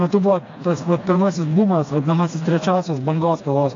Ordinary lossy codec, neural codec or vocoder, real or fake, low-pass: AAC, 48 kbps; codec, 16 kHz, 2 kbps, FreqCodec, smaller model; fake; 7.2 kHz